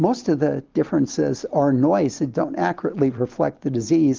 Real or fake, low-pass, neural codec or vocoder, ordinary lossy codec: real; 7.2 kHz; none; Opus, 24 kbps